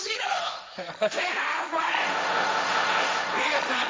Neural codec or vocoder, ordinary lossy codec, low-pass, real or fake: codec, 16 kHz, 1.1 kbps, Voila-Tokenizer; none; none; fake